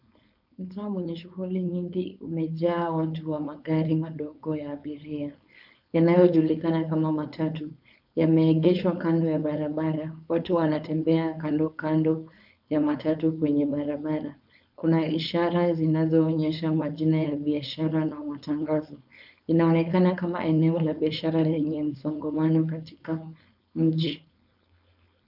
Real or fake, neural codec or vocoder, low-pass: fake; codec, 16 kHz, 4.8 kbps, FACodec; 5.4 kHz